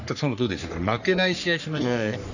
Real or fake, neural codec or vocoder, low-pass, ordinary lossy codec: fake; codec, 44.1 kHz, 3.4 kbps, Pupu-Codec; 7.2 kHz; none